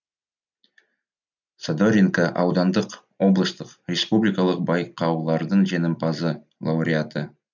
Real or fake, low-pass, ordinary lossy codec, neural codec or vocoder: real; 7.2 kHz; none; none